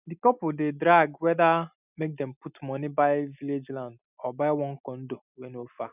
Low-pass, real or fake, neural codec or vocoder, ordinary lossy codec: 3.6 kHz; real; none; none